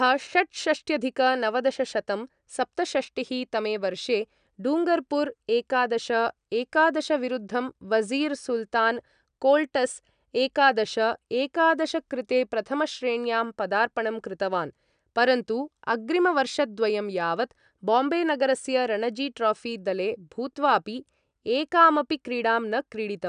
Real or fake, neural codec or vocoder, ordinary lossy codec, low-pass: real; none; none; 9.9 kHz